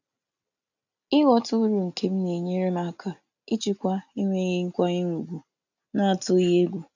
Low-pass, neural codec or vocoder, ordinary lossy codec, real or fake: 7.2 kHz; none; none; real